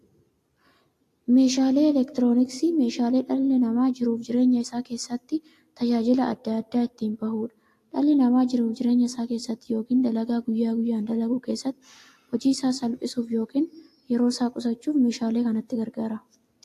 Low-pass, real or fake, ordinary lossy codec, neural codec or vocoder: 14.4 kHz; real; AAC, 64 kbps; none